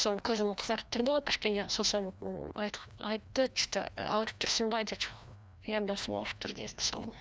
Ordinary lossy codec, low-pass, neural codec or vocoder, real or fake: none; none; codec, 16 kHz, 1 kbps, FreqCodec, larger model; fake